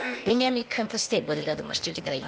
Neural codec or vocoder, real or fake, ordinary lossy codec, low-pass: codec, 16 kHz, 0.8 kbps, ZipCodec; fake; none; none